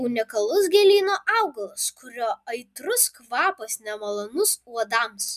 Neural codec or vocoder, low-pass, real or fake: none; 14.4 kHz; real